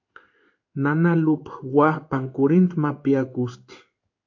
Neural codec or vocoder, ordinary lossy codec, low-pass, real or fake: codec, 16 kHz in and 24 kHz out, 1 kbps, XY-Tokenizer; AAC, 48 kbps; 7.2 kHz; fake